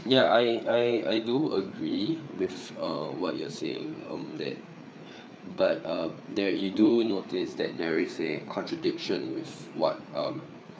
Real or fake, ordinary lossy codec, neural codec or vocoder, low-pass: fake; none; codec, 16 kHz, 4 kbps, FreqCodec, larger model; none